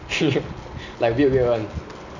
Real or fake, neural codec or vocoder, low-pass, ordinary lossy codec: real; none; 7.2 kHz; none